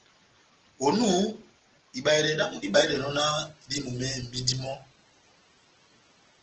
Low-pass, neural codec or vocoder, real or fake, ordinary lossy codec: 7.2 kHz; none; real; Opus, 16 kbps